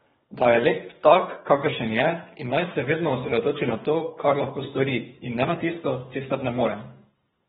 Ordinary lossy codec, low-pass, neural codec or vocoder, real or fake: AAC, 16 kbps; 10.8 kHz; codec, 24 kHz, 3 kbps, HILCodec; fake